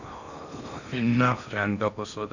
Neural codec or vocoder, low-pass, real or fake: codec, 16 kHz in and 24 kHz out, 0.6 kbps, FocalCodec, streaming, 4096 codes; 7.2 kHz; fake